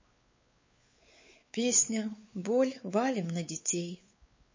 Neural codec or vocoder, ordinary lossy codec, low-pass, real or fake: codec, 16 kHz, 4 kbps, X-Codec, WavLM features, trained on Multilingual LibriSpeech; MP3, 32 kbps; 7.2 kHz; fake